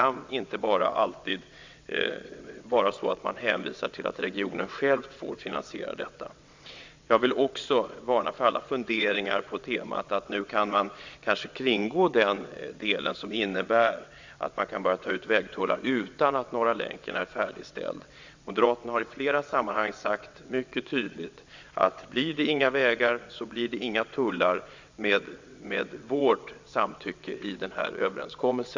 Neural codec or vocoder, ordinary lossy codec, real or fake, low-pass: vocoder, 22.05 kHz, 80 mel bands, WaveNeXt; MP3, 64 kbps; fake; 7.2 kHz